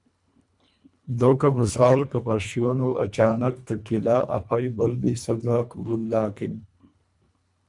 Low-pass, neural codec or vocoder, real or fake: 10.8 kHz; codec, 24 kHz, 1.5 kbps, HILCodec; fake